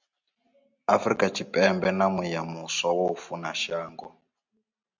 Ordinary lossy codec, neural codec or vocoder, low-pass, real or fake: MP3, 64 kbps; none; 7.2 kHz; real